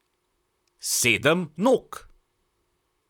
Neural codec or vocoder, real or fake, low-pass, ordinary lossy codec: vocoder, 44.1 kHz, 128 mel bands, Pupu-Vocoder; fake; 19.8 kHz; none